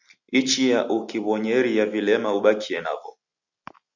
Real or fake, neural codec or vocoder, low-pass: real; none; 7.2 kHz